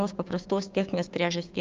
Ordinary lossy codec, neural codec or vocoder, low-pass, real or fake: Opus, 24 kbps; codec, 16 kHz, 6 kbps, DAC; 7.2 kHz; fake